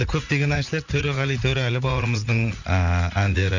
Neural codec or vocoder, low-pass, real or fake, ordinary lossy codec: vocoder, 44.1 kHz, 80 mel bands, Vocos; 7.2 kHz; fake; none